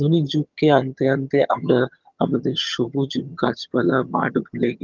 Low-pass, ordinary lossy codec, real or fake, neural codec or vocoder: 7.2 kHz; Opus, 32 kbps; fake; vocoder, 22.05 kHz, 80 mel bands, HiFi-GAN